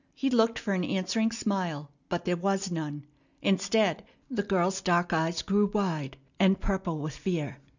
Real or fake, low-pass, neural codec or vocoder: real; 7.2 kHz; none